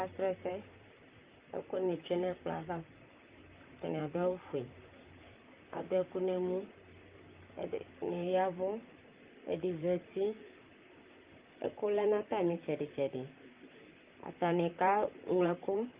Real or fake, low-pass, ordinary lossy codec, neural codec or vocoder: fake; 3.6 kHz; Opus, 24 kbps; vocoder, 44.1 kHz, 128 mel bands, Pupu-Vocoder